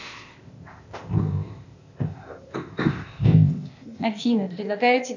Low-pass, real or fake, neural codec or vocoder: 7.2 kHz; fake; codec, 16 kHz, 0.8 kbps, ZipCodec